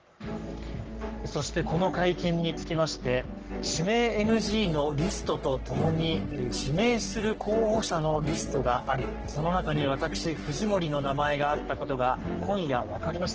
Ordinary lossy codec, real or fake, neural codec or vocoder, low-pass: Opus, 16 kbps; fake; codec, 44.1 kHz, 3.4 kbps, Pupu-Codec; 7.2 kHz